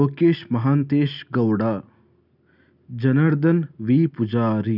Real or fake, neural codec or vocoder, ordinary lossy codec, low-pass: real; none; none; 5.4 kHz